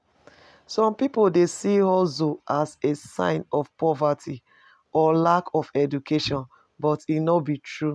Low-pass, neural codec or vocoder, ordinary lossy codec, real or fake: none; none; none; real